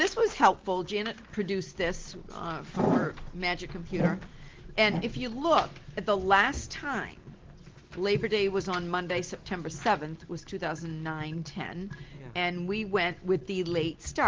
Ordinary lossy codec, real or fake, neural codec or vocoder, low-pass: Opus, 16 kbps; real; none; 7.2 kHz